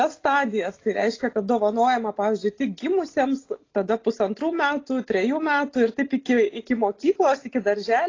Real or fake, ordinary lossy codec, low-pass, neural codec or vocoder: real; AAC, 32 kbps; 7.2 kHz; none